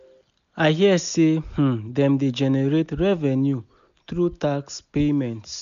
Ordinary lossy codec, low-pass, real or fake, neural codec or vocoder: none; 7.2 kHz; real; none